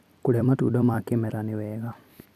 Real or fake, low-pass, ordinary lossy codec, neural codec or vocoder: fake; 14.4 kHz; none; vocoder, 44.1 kHz, 128 mel bands every 256 samples, BigVGAN v2